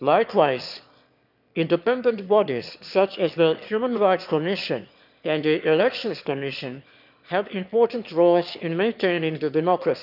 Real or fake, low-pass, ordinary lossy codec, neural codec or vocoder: fake; 5.4 kHz; none; autoencoder, 22.05 kHz, a latent of 192 numbers a frame, VITS, trained on one speaker